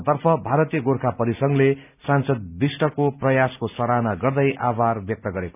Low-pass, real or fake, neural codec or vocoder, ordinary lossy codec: 3.6 kHz; real; none; none